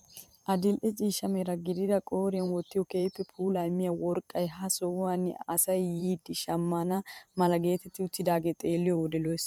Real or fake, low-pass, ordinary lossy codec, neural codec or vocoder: fake; 19.8 kHz; MP3, 96 kbps; vocoder, 44.1 kHz, 128 mel bands every 512 samples, BigVGAN v2